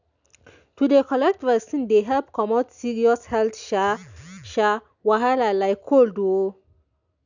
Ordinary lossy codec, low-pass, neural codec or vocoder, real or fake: none; 7.2 kHz; none; real